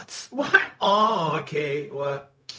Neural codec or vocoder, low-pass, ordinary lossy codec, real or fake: codec, 16 kHz, 0.4 kbps, LongCat-Audio-Codec; none; none; fake